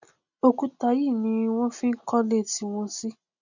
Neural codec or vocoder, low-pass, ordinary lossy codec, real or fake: none; 7.2 kHz; none; real